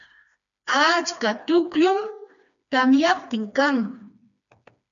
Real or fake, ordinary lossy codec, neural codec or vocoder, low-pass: fake; MP3, 96 kbps; codec, 16 kHz, 2 kbps, FreqCodec, smaller model; 7.2 kHz